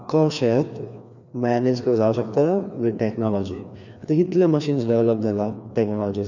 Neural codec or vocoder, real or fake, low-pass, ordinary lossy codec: codec, 16 kHz, 2 kbps, FreqCodec, larger model; fake; 7.2 kHz; none